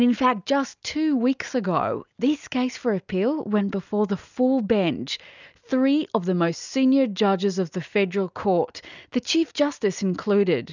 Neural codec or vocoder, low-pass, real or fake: none; 7.2 kHz; real